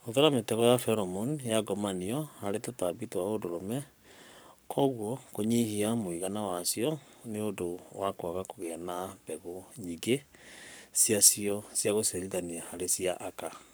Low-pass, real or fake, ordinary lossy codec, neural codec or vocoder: none; fake; none; codec, 44.1 kHz, 7.8 kbps, Pupu-Codec